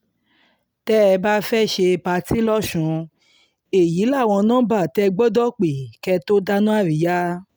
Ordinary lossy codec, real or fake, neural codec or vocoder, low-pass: none; real; none; none